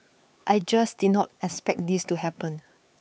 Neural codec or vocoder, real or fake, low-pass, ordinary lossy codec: codec, 16 kHz, 4 kbps, X-Codec, HuBERT features, trained on LibriSpeech; fake; none; none